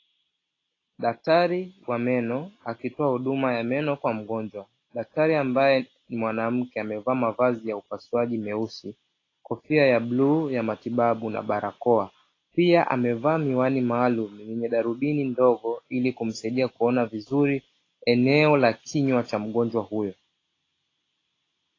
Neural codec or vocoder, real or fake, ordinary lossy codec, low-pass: none; real; AAC, 32 kbps; 7.2 kHz